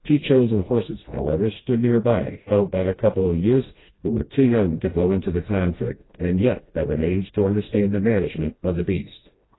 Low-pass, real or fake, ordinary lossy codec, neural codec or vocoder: 7.2 kHz; fake; AAC, 16 kbps; codec, 16 kHz, 1 kbps, FreqCodec, smaller model